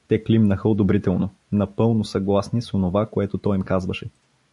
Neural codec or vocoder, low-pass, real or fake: none; 10.8 kHz; real